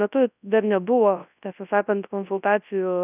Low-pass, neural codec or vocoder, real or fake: 3.6 kHz; codec, 24 kHz, 0.9 kbps, WavTokenizer, large speech release; fake